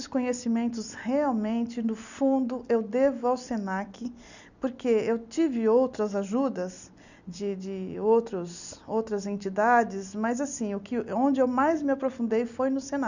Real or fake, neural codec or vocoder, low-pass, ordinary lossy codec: real; none; 7.2 kHz; none